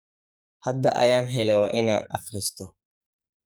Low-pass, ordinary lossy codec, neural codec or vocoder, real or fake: none; none; codec, 44.1 kHz, 2.6 kbps, SNAC; fake